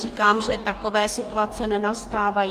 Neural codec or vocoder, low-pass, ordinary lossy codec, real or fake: codec, 44.1 kHz, 2.6 kbps, DAC; 14.4 kHz; Opus, 32 kbps; fake